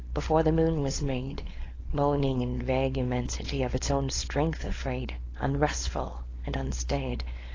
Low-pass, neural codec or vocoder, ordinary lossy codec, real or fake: 7.2 kHz; codec, 16 kHz, 4.8 kbps, FACodec; AAC, 32 kbps; fake